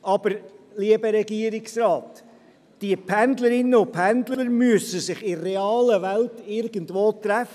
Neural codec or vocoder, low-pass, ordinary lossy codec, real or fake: none; 14.4 kHz; none; real